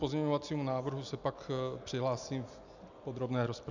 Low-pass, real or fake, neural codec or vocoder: 7.2 kHz; real; none